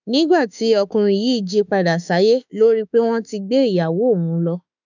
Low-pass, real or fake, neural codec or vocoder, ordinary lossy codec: 7.2 kHz; fake; autoencoder, 48 kHz, 32 numbers a frame, DAC-VAE, trained on Japanese speech; none